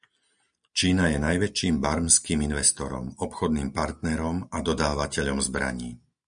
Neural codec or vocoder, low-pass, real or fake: none; 9.9 kHz; real